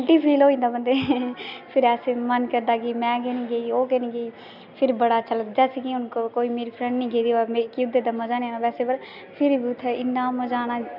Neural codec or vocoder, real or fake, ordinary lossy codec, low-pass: none; real; none; 5.4 kHz